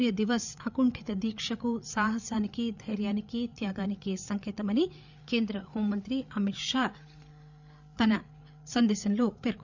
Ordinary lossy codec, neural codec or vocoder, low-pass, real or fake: none; codec, 16 kHz, 8 kbps, FreqCodec, larger model; 7.2 kHz; fake